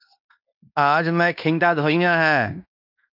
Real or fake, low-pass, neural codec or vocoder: fake; 5.4 kHz; codec, 16 kHz in and 24 kHz out, 0.9 kbps, LongCat-Audio-Codec, fine tuned four codebook decoder